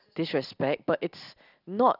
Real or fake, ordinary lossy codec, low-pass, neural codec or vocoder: real; none; 5.4 kHz; none